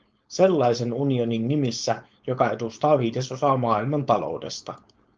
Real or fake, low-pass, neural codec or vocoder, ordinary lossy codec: fake; 7.2 kHz; codec, 16 kHz, 4.8 kbps, FACodec; Opus, 24 kbps